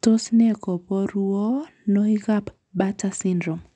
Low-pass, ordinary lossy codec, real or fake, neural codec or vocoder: 10.8 kHz; none; real; none